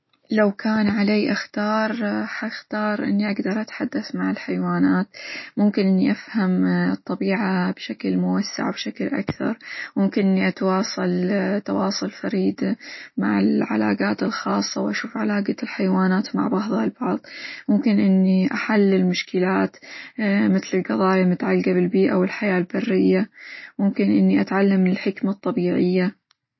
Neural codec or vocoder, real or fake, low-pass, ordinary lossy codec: none; real; 7.2 kHz; MP3, 24 kbps